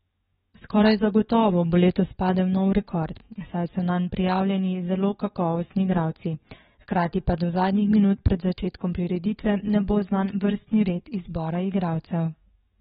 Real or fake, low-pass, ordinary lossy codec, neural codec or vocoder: fake; 19.8 kHz; AAC, 16 kbps; codec, 44.1 kHz, 7.8 kbps, DAC